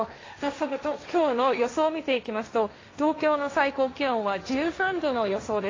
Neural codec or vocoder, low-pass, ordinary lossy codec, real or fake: codec, 16 kHz, 1.1 kbps, Voila-Tokenizer; 7.2 kHz; AAC, 32 kbps; fake